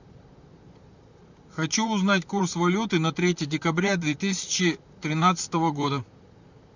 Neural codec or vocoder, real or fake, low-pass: vocoder, 44.1 kHz, 128 mel bands, Pupu-Vocoder; fake; 7.2 kHz